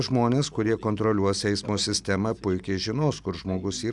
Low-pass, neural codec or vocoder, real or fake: 10.8 kHz; none; real